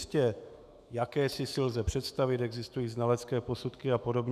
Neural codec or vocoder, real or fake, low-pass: autoencoder, 48 kHz, 128 numbers a frame, DAC-VAE, trained on Japanese speech; fake; 14.4 kHz